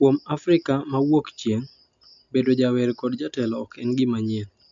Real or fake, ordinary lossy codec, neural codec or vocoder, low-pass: real; AAC, 64 kbps; none; 7.2 kHz